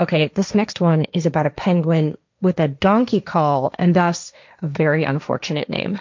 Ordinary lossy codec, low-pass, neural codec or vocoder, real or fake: MP3, 64 kbps; 7.2 kHz; codec, 16 kHz, 1.1 kbps, Voila-Tokenizer; fake